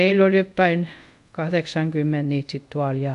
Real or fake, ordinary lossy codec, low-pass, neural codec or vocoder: fake; none; 10.8 kHz; codec, 24 kHz, 0.5 kbps, DualCodec